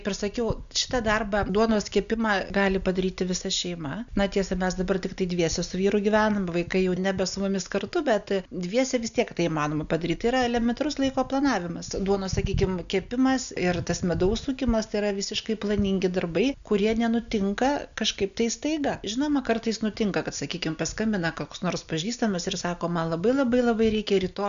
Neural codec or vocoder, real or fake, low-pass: none; real; 7.2 kHz